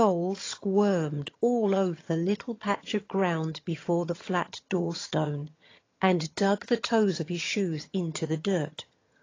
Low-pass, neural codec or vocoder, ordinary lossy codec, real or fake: 7.2 kHz; vocoder, 22.05 kHz, 80 mel bands, HiFi-GAN; AAC, 32 kbps; fake